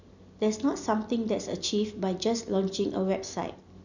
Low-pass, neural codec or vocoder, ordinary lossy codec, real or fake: 7.2 kHz; none; none; real